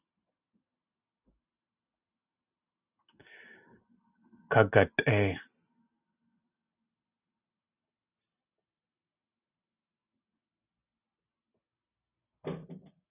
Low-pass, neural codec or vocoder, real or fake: 3.6 kHz; none; real